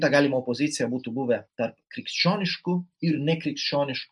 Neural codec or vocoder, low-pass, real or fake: none; 10.8 kHz; real